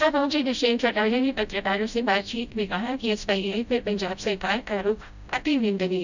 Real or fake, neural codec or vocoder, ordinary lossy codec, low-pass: fake; codec, 16 kHz, 0.5 kbps, FreqCodec, smaller model; none; 7.2 kHz